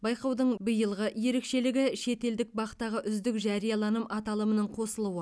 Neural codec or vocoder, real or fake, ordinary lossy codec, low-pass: none; real; none; none